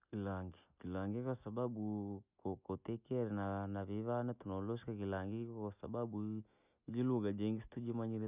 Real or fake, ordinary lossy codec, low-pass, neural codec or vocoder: real; none; 3.6 kHz; none